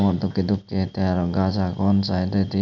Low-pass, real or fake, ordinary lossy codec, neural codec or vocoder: 7.2 kHz; real; none; none